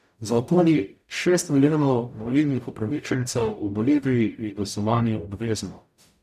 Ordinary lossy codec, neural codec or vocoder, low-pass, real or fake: none; codec, 44.1 kHz, 0.9 kbps, DAC; 14.4 kHz; fake